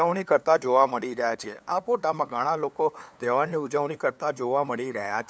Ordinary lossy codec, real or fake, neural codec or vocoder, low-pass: none; fake; codec, 16 kHz, 2 kbps, FunCodec, trained on LibriTTS, 25 frames a second; none